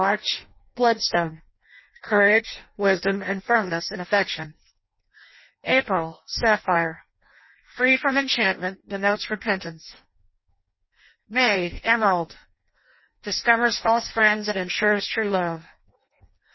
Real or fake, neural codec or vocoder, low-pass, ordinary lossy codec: fake; codec, 16 kHz in and 24 kHz out, 0.6 kbps, FireRedTTS-2 codec; 7.2 kHz; MP3, 24 kbps